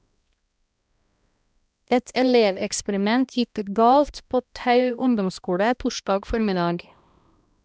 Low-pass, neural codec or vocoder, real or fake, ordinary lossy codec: none; codec, 16 kHz, 1 kbps, X-Codec, HuBERT features, trained on balanced general audio; fake; none